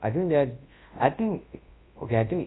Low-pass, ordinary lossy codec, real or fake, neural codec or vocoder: 7.2 kHz; AAC, 16 kbps; fake; codec, 24 kHz, 0.9 kbps, WavTokenizer, large speech release